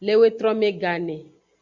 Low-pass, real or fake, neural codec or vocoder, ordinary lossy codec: 7.2 kHz; real; none; MP3, 64 kbps